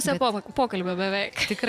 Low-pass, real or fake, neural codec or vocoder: 14.4 kHz; fake; vocoder, 44.1 kHz, 128 mel bands every 512 samples, BigVGAN v2